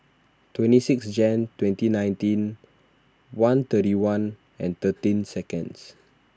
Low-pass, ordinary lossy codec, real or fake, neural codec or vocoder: none; none; real; none